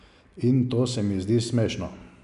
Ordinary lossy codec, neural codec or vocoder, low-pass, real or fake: none; none; 10.8 kHz; real